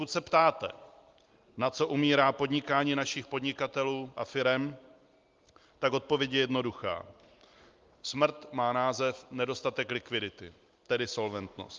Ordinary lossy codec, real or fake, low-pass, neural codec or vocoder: Opus, 32 kbps; real; 7.2 kHz; none